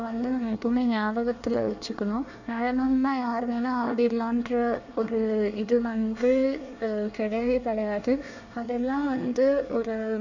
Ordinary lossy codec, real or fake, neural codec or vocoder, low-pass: none; fake; codec, 24 kHz, 1 kbps, SNAC; 7.2 kHz